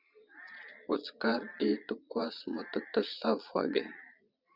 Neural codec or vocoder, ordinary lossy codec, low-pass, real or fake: vocoder, 22.05 kHz, 80 mel bands, Vocos; Opus, 64 kbps; 5.4 kHz; fake